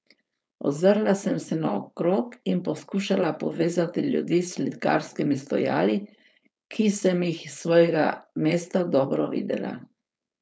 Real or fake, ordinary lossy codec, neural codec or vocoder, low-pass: fake; none; codec, 16 kHz, 4.8 kbps, FACodec; none